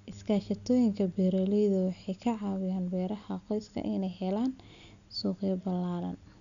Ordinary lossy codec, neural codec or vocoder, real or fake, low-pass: none; none; real; 7.2 kHz